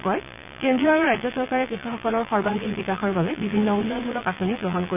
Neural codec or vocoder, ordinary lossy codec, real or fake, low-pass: vocoder, 22.05 kHz, 80 mel bands, Vocos; none; fake; 3.6 kHz